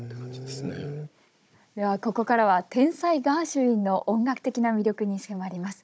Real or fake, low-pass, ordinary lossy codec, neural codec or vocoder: fake; none; none; codec, 16 kHz, 16 kbps, FunCodec, trained on Chinese and English, 50 frames a second